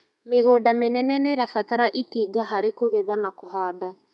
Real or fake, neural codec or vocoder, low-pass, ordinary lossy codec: fake; codec, 32 kHz, 1.9 kbps, SNAC; 10.8 kHz; none